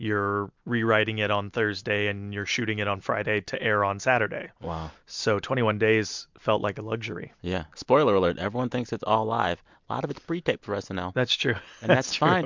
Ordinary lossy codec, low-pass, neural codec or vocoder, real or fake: MP3, 64 kbps; 7.2 kHz; none; real